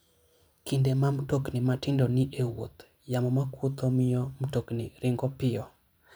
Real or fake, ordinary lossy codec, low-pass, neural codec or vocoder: fake; none; none; vocoder, 44.1 kHz, 128 mel bands every 512 samples, BigVGAN v2